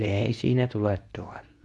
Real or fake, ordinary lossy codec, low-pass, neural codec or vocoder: fake; none; none; codec, 24 kHz, 0.9 kbps, WavTokenizer, medium speech release version 2